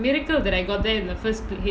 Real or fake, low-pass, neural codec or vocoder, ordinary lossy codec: real; none; none; none